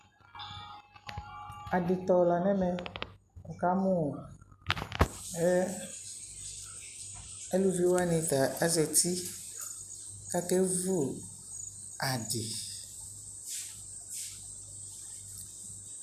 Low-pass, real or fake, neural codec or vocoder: 14.4 kHz; real; none